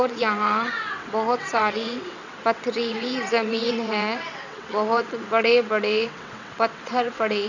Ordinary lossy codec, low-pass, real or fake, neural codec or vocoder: none; 7.2 kHz; fake; vocoder, 22.05 kHz, 80 mel bands, WaveNeXt